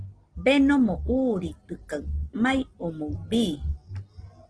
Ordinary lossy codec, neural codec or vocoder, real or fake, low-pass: Opus, 16 kbps; none; real; 9.9 kHz